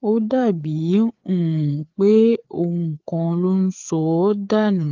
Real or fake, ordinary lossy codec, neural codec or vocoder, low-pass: fake; Opus, 32 kbps; codec, 16 kHz, 4 kbps, FreqCodec, larger model; 7.2 kHz